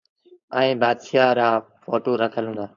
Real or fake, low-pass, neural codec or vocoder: fake; 7.2 kHz; codec, 16 kHz, 4.8 kbps, FACodec